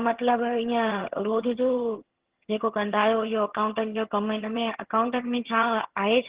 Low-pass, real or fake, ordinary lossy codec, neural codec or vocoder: 3.6 kHz; fake; Opus, 16 kbps; vocoder, 22.05 kHz, 80 mel bands, HiFi-GAN